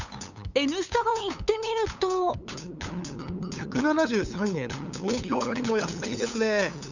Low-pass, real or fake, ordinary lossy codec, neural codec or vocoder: 7.2 kHz; fake; none; codec, 16 kHz, 8 kbps, FunCodec, trained on LibriTTS, 25 frames a second